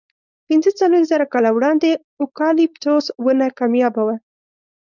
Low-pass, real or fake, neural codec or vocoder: 7.2 kHz; fake; codec, 16 kHz, 4.8 kbps, FACodec